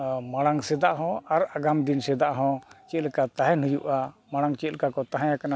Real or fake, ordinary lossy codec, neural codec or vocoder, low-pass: real; none; none; none